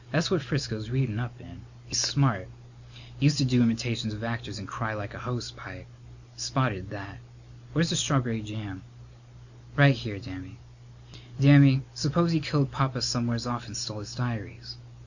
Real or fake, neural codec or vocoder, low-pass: real; none; 7.2 kHz